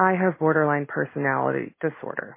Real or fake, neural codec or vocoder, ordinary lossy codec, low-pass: real; none; MP3, 24 kbps; 3.6 kHz